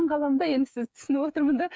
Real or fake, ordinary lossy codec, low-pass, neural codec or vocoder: fake; none; none; codec, 16 kHz, 8 kbps, FreqCodec, smaller model